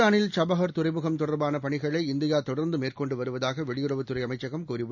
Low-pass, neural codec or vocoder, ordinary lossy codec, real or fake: 7.2 kHz; none; none; real